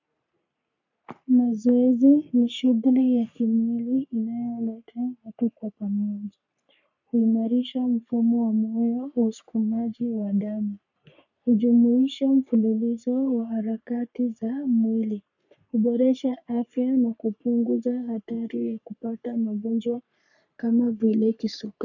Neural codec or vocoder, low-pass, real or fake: codec, 44.1 kHz, 3.4 kbps, Pupu-Codec; 7.2 kHz; fake